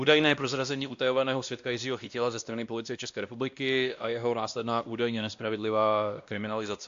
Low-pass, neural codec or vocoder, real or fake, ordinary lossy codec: 7.2 kHz; codec, 16 kHz, 1 kbps, X-Codec, WavLM features, trained on Multilingual LibriSpeech; fake; AAC, 64 kbps